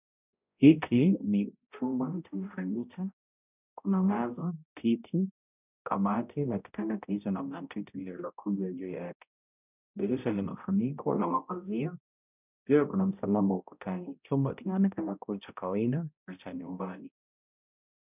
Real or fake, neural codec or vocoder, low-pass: fake; codec, 16 kHz, 0.5 kbps, X-Codec, HuBERT features, trained on balanced general audio; 3.6 kHz